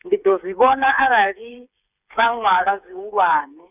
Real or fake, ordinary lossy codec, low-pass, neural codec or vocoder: fake; none; 3.6 kHz; codec, 16 kHz, 4 kbps, FreqCodec, smaller model